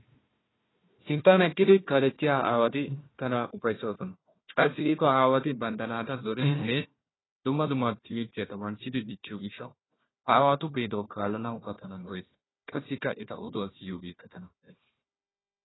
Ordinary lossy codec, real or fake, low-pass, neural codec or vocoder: AAC, 16 kbps; fake; 7.2 kHz; codec, 16 kHz, 1 kbps, FunCodec, trained on Chinese and English, 50 frames a second